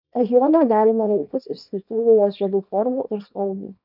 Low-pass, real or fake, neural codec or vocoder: 5.4 kHz; fake; codec, 24 kHz, 0.9 kbps, WavTokenizer, small release